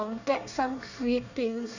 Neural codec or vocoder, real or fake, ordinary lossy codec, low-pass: codec, 24 kHz, 1 kbps, SNAC; fake; none; 7.2 kHz